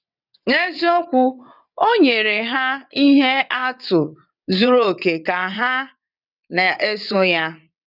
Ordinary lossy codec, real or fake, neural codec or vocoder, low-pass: none; fake; vocoder, 22.05 kHz, 80 mel bands, WaveNeXt; 5.4 kHz